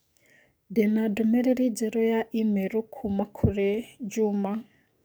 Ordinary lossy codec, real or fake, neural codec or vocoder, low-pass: none; fake; codec, 44.1 kHz, 7.8 kbps, DAC; none